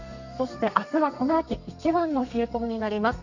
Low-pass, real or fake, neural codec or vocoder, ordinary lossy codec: 7.2 kHz; fake; codec, 32 kHz, 1.9 kbps, SNAC; none